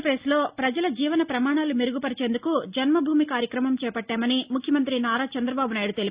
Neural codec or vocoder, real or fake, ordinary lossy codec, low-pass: none; real; Opus, 64 kbps; 3.6 kHz